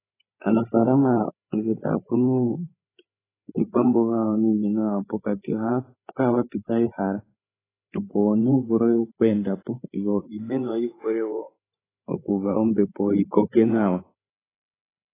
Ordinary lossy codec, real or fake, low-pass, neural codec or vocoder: AAC, 16 kbps; fake; 3.6 kHz; codec, 16 kHz, 8 kbps, FreqCodec, larger model